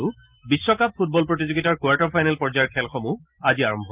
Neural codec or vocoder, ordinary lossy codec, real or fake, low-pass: none; Opus, 32 kbps; real; 3.6 kHz